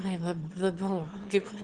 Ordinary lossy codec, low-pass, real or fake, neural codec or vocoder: Opus, 16 kbps; 9.9 kHz; fake; autoencoder, 22.05 kHz, a latent of 192 numbers a frame, VITS, trained on one speaker